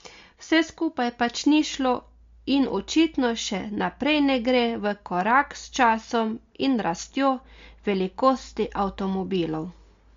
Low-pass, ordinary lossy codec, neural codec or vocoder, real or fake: 7.2 kHz; MP3, 48 kbps; none; real